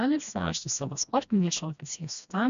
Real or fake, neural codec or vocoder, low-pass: fake; codec, 16 kHz, 1 kbps, FreqCodec, smaller model; 7.2 kHz